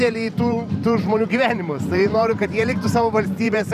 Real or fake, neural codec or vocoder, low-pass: fake; vocoder, 44.1 kHz, 128 mel bands every 512 samples, BigVGAN v2; 14.4 kHz